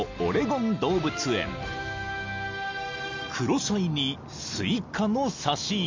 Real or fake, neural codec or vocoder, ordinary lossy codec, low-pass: real; none; MP3, 64 kbps; 7.2 kHz